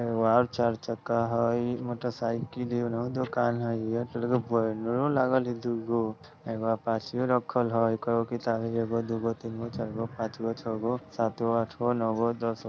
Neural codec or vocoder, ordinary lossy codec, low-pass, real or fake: none; none; none; real